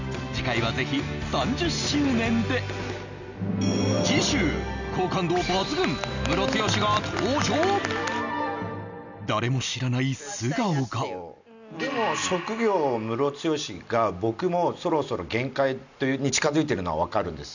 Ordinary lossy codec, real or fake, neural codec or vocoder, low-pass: none; real; none; 7.2 kHz